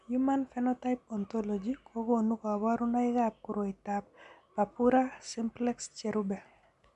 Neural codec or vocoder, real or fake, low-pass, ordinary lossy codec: none; real; 10.8 kHz; MP3, 96 kbps